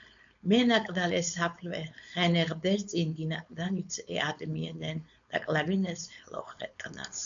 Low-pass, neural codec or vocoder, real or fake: 7.2 kHz; codec, 16 kHz, 4.8 kbps, FACodec; fake